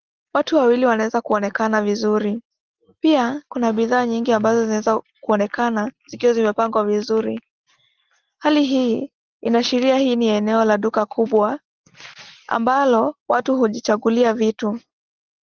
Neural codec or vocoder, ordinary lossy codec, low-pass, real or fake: none; Opus, 16 kbps; 7.2 kHz; real